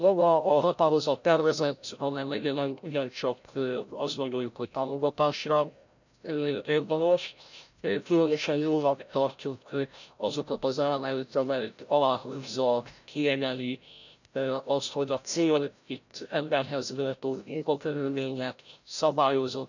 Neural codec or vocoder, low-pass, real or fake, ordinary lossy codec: codec, 16 kHz, 0.5 kbps, FreqCodec, larger model; 7.2 kHz; fake; none